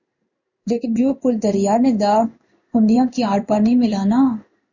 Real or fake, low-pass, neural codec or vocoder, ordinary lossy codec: fake; 7.2 kHz; codec, 16 kHz in and 24 kHz out, 1 kbps, XY-Tokenizer; Opus, 64 kbps